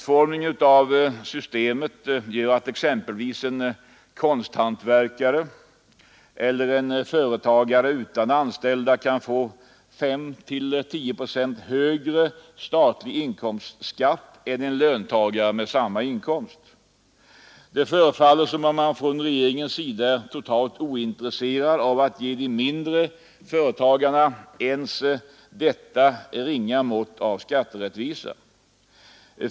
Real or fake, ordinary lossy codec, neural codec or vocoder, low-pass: real; none; none; none